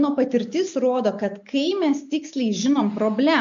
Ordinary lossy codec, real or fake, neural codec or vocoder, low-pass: MP3, 48 kbps; real; none; 7.2 kHz